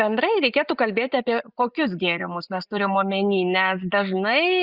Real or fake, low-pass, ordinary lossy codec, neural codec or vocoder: real; 5.4 kHz; Opus, 64 kbps; none